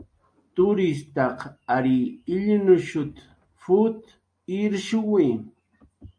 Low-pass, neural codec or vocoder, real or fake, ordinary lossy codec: 9.9 kHz; none; real; MP3, 48 kbps